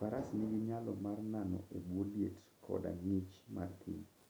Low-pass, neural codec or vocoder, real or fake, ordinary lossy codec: none; none; real; none